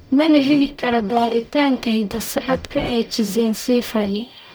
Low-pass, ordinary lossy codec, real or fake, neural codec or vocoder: none; none; fake; codec, 44.1 kHz, 0.9 kbps, DAC